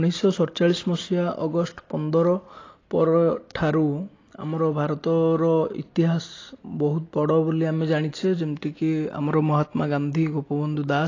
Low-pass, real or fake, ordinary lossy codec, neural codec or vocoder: 7.2 kHz; real; AAC, 32 kbps; none